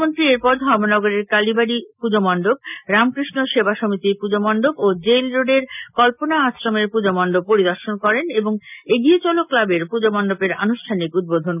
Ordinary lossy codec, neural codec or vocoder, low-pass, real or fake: none; none; 3.6 kHz; real